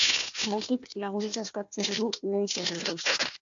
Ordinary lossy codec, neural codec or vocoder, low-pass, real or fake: AAC, 64 kbps; codec, 16 kHz, 1 kbps, FunCodec, trained on Chinese and English, 50 frames a second; 7.2 kHz; fake